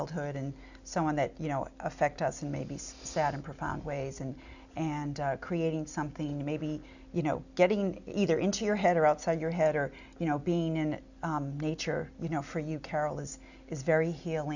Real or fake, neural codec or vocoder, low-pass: real; none; 7.2 kHz